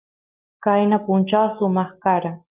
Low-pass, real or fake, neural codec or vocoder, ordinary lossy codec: 3.6 kHz; real; none; Opus, 32 kbps